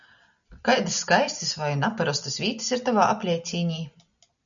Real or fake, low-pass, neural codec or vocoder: real; 7.2 kHz; none